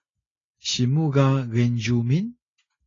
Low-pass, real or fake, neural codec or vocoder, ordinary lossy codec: 7.2 kHz; real; none; AAC, 32 kbps